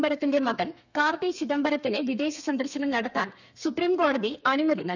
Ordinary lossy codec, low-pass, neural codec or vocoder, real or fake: none; 7.2 kHz; codec, 32 kHz, 1.9 kbps, SNAC; fake